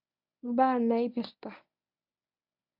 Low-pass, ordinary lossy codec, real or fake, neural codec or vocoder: 5.4 kHz; none; fake; codec, 24 kHz, 0.9 kbps, WavTokenizer, medium speech release version 1